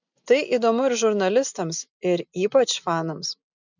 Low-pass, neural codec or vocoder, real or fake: 7.2 kHz; none; real